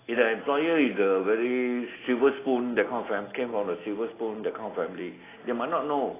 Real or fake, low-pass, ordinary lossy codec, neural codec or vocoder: real; 3.6 kHz; AAC, 16 kbps; none